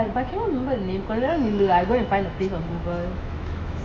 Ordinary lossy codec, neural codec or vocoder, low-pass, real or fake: none; autoencoder, 48 kHz, 128 numbers a frame, DAC-VAE, trained on Japanese speech; 9.9 kHz; fake